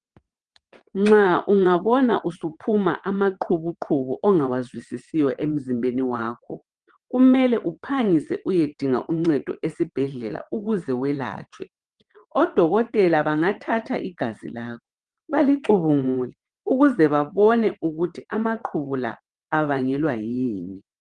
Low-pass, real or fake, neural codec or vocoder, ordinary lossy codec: 9.9 kHz; fake; vocoder, 22.05 kHz, 80 mel bands, WaveNeXt; Opus, 24 kbps